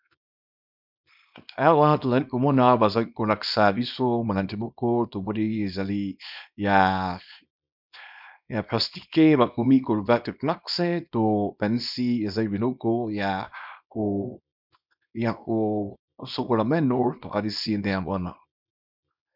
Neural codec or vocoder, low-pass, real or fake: codec, 24 kHz, 0.9 kbps, WavTokenizer, small release; 5.4 kHz; fake